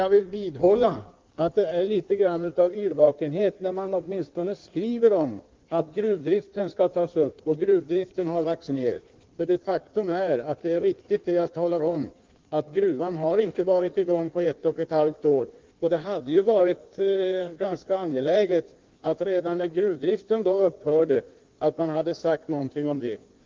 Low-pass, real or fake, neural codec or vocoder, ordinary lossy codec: 7.2 kHz; fake; codec, 16 kHz in and 24 kHz out, 1.1 kbps, FireRedTTS-2 codec; Opus, 32 kbps